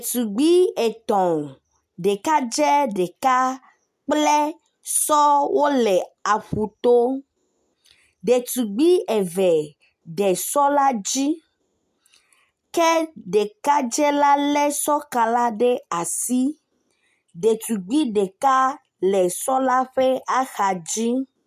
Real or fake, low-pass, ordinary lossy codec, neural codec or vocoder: real; 14.4 kHz; MP3, 96 kbps; none